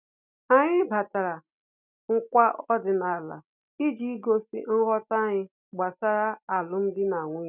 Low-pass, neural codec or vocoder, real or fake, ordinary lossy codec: 3.6 kHz; none; real; none